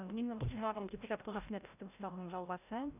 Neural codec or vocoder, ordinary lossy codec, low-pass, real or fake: codec, 16 kHz, 0.5 kbps, FreqCodec, larger model; none; 3.6 kHz; fake